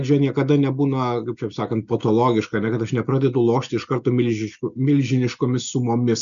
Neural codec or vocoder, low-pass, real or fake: none; 7.2 kHz; real